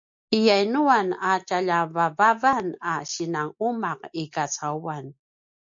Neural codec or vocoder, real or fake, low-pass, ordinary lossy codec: none; real; 7.2 kHz; MP3, 64 kbps